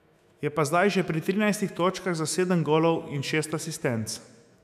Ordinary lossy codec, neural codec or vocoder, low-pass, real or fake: none; autoencoder, 48 kHz, 128 numbers a frame, DAC-VAE, trained on Japanese speech; 14.4 kHz; fake